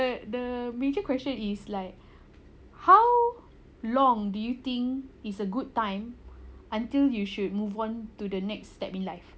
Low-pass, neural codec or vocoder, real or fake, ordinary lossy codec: none; none; real; none